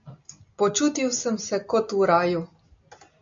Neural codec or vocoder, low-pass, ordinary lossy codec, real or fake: none; 7.2 kHz; MP3, 64 kbps; real